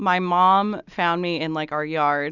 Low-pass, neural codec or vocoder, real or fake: 7.2 kHz; none; real